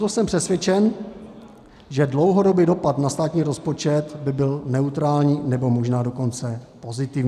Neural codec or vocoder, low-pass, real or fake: none; 14.4 kHz; real